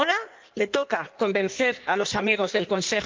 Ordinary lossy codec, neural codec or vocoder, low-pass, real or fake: Opus, 24 kbps; codec, 16 kHz in and 24 kHz out, 1.1 kbps, FireRedTTS-2 codec; 7.2 kHz; fake